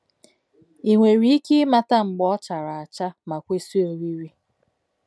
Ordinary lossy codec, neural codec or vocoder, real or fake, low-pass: none; none; real; none